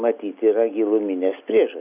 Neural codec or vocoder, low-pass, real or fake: none; 3.6 kHz; real